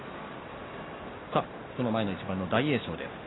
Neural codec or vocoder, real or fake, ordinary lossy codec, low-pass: none; real; AAC, 16 kbps; 7.2 kHz